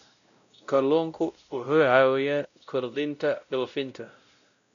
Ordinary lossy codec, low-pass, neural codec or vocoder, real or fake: none; 7.2 kHz; codec, 16 kHz, 0.5 kbps, X-Codec, WavLM features, trained on Multilingual LibriSpeech; fake